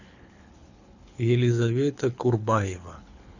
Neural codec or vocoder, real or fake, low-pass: codec, 24 kHz, 6 kbps, HILCodec; fake; 7.2 kHz